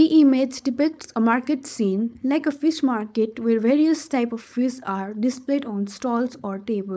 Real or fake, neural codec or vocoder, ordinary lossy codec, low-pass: fake; codec, 16 kHz, 4.8 kbps, FACodec; none; none